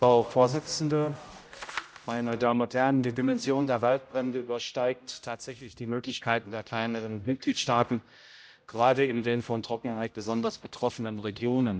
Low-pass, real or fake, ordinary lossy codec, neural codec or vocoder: none; fake; none; codec, 16 kHz, 0.5 kbps, X-Codec, HuBERT features, trained on general audio